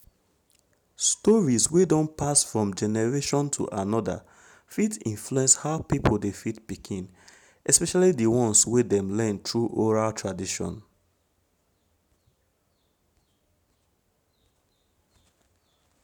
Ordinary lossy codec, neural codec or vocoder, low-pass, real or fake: none; none; none; real